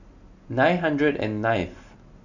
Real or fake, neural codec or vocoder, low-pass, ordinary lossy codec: real; none; 7.2 kHz; none